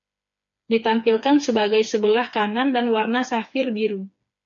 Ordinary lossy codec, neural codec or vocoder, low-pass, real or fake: MP3, 48 kbps; codec, 16 kHz, 4 kbps, FreqCodec, smaller model; 7.2 kHz; fake